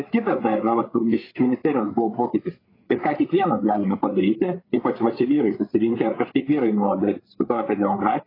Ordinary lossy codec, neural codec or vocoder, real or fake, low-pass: AAC, 24 kbps; codec, 16 kHz, 8 kbps, FreqCodec, larger model; fake; 5.4 kHz